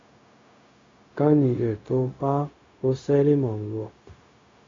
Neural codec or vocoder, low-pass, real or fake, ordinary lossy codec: codec, 16 kHz, 0.4 kbps, LongCat-Audio-Codec; 7.2 kHz; fake; AAC, 32 kbps